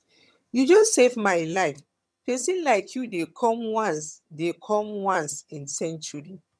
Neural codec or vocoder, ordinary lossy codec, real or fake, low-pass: vocoder, 22.05 kHz, 80 mel bands, HiFi-GAN; none; fake; none